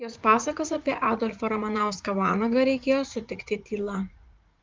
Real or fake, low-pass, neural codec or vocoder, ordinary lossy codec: fake; 7.2 kHz; vocoder, 44.1 kHz, 80 mel bands, Vocos; Opus, 32 kbps